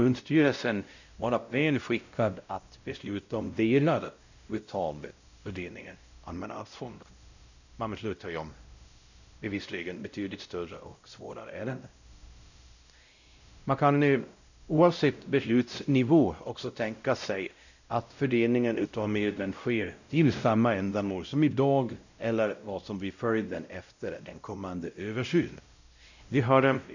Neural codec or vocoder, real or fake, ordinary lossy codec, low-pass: codec, 16 kHz, 0.5 kbps, X-Codec, WavLM features, trained on Multilingual LibriSpeech; fake; none; 7.2 kHz